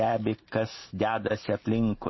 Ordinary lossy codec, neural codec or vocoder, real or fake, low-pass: MP3, 24 kbps; none; real; 7.2 kHz